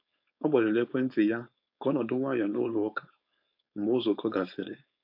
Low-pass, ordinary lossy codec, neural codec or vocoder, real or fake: 5.4 kHz; MP3, 48 kbps; codec, 16 kHz, 4.8 kbps, FACodec; fake